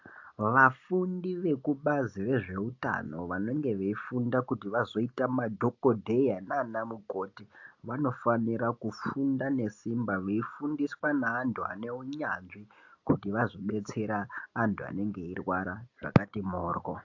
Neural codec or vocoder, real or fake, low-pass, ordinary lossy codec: none; real; 7.2 kHz; AAC, 48 kbps